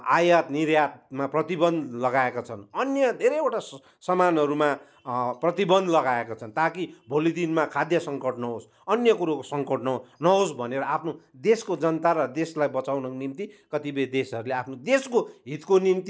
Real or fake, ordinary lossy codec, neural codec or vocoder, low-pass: real; none; none; none